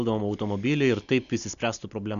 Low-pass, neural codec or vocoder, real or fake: 7.2 kHz; none; real